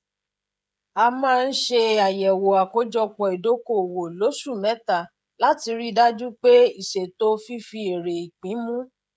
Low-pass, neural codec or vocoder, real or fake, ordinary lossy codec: none; codec, 16 kHz, 16 kbps, FreqCodec, smaller model; fake; none